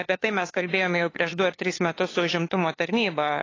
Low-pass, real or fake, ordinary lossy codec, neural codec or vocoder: 7.2 kHz; fake; AAC, 32 kbps; codec, 16 kHz, 2 kbps, FunCodec, trained on LibriTTS, 25 frames a second